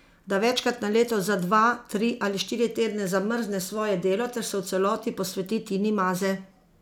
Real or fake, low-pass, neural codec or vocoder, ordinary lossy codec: real; none; none; none